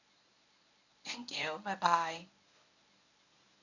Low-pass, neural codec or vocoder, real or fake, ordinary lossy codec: 7.2 kHz; codec, 24 kHz, 0.9 kbps, WavTokenizer, medium speech release version 1; fake; none